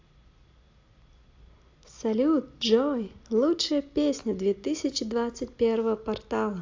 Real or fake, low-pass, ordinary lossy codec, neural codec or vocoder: real; 7.2 kHz; AAC, 48 kbps; none